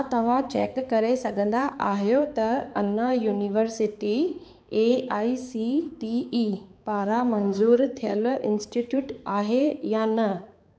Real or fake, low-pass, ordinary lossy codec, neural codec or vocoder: fake; none; none; codec, 16 kHz, 4 kbps, X-Codec, HuBERT features, trained on balanced general audio